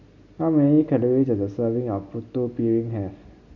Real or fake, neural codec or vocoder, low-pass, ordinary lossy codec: real; none; 7.2 kHz; none